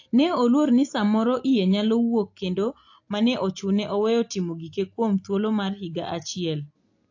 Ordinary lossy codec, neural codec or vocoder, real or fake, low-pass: AAC, 48 kbps; none; real; 7.2 kHz